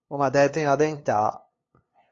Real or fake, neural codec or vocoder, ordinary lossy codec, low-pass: fake; codec, 16 kHz, 2 kbps, FunCodec, trained on LibriTTS, 25 frames a second; AAC, 32 kbps; 7.2 kHz